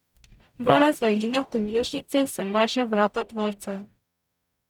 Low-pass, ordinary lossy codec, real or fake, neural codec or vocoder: 19.8 kHz; none; fake; codec, 44.1 kHz, 0.9 kbps, DAC